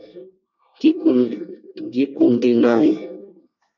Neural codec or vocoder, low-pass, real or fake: codec, 24 kHz, 1 kbps, SNAC; 7.2 kHz; fake